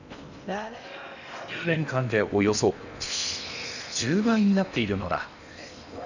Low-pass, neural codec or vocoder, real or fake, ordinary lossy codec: 7.2 kHz; codec, 16 kHz in and 24 kHz out, 0.8 kbps, FocalCodec, streaming, 65536 codes; fake; none